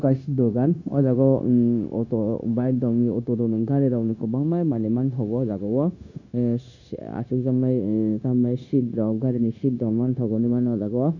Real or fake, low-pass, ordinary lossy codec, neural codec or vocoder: fake; 7.2 kHz; none; codec, 16 kHz, 0.9 kbps, LongCat-Audio-Codec